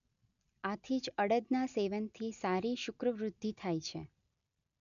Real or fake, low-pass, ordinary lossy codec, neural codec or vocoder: real; 7.2 kHz; none; none